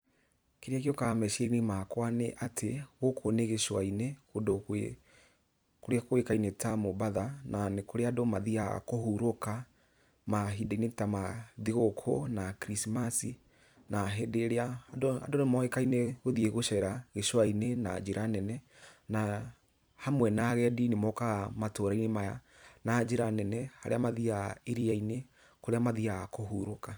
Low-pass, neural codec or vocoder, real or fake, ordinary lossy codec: none; vocoder, 44.1 kHz, 128 mel bands every 256 samples, BigVGAN v2; fake; none